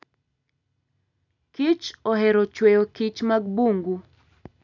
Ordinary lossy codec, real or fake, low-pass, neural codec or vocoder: none; real; 7.2 kHz; none